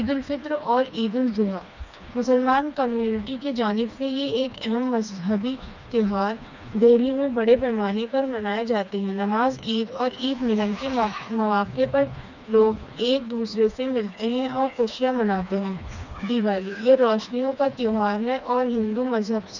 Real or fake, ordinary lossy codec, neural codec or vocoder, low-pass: fake; none; codec, 16 kHz, 2 kbps, FreqCodec, smaller model; 7.2 kHz